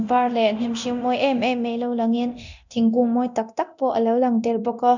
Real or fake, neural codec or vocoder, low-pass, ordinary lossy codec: fake; codec, 24 kHz, 0.9 kbps, DualCodec; 7.2 kHz; none